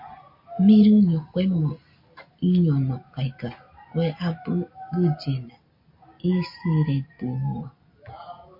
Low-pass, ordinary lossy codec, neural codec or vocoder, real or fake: 5.4 kHz; MP3, 32 kbps; none; real